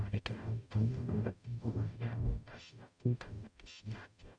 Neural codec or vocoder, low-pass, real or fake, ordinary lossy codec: codec, 44.1 kHz, 0.9 kbps, DAC; 9.9 kHz; fake; none